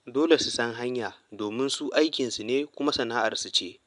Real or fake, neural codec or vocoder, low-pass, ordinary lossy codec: real; none; 10.8 kHz; MP3, 96 kbps